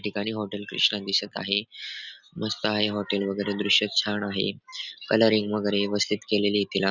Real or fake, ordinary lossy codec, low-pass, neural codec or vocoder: real; none; 7.2 kHz; none